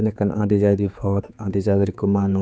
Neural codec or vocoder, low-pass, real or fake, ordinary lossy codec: codec, 16 kHz, 4 kbps, X-Codec, HuBERT features, trained on general audio; none; fake; none